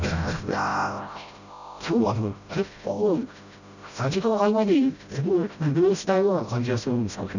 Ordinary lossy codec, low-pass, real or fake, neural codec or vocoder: none; 7.2 kHz; fake; codec, 16 kHz, 0.5 kbps, FreqCodec, smaller model